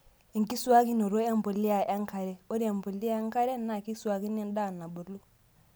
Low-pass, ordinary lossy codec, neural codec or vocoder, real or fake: none; none; none; real